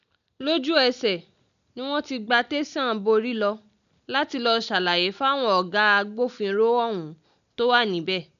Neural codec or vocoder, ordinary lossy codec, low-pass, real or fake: none; none; 7.2 kHz; real